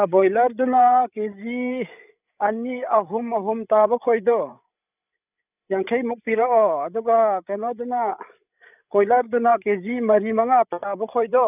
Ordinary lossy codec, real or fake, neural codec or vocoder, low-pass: none; fake; codec, 16 kHz, 8 kbps, FreqCodec, larger model; 3.6 kHz